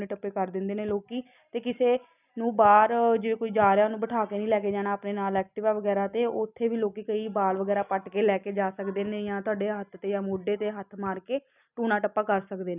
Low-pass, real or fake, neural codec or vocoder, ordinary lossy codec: 3.6 kHz; real; none; none